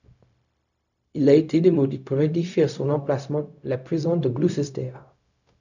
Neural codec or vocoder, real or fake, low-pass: codec, 16 kHz, 0.4 kbps, LongCat-Audio-Codec; fake; 7.2 kHz